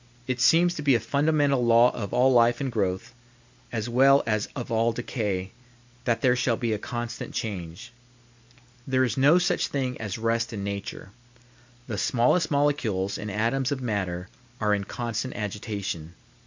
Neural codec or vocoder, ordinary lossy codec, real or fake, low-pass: none; MP3, 64 kbps; real; 7.2 kHz